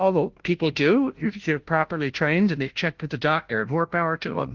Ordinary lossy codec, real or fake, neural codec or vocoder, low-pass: Opus, 16 kbps; fake; codec, 16 kHz, 0.5 kbps, FunCodec, trained on Chinese and English, 25 frames a second; 7.2 kHz